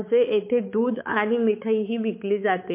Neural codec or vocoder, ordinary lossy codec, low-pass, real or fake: codec, 16 kHz, 4 kbps, X-Codec, HuBERT features, trained on balanced general audio; MP3, 24 kbps; 3.6 kHz; fake